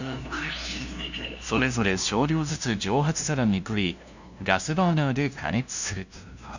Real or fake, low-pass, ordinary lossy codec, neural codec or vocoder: fake; 7.2 kHz; none; codec, 16 kHz, 0.5 kbps, FunCodec, trained on LibriTTS, 25 frames a second